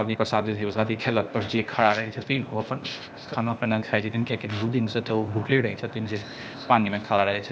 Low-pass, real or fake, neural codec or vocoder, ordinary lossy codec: none; fake; codec, 16 kHz, 0.8 kbps, ZipCodec; none